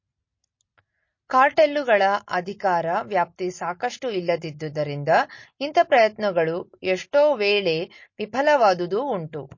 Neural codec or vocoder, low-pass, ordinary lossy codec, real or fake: none; 7.2 kHz; MP3, 32 kbps; real